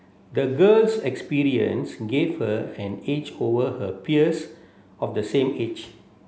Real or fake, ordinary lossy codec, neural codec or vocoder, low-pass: real; none; none; none